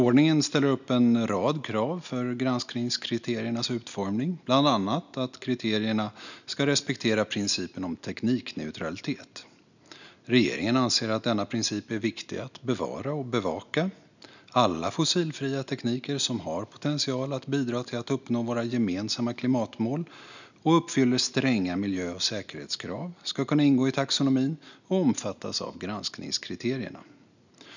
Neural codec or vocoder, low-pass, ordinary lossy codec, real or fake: none; 7.2 kHz; none; real